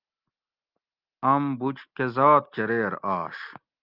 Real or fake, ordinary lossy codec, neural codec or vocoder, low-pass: real; Opus, 24 kbps; none; 5.4 kHz